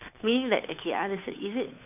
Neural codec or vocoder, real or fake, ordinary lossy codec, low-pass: codec, 16 kHz, 2 kbps, FunCodec, trained on Chinese and English, 25 frames a second; fake; none; 3.6 kHz